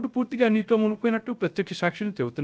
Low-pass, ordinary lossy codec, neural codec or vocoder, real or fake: none; none; codec, 16 kHz, 0.3 kbps, FocalCodec; fake